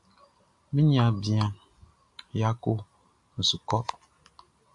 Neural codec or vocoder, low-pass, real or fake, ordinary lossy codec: none; 10.8 kHz; real; AAC, 64 kbps